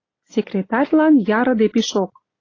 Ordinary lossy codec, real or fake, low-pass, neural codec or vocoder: AAC, 32 kbps; real; 7.2 kHz; none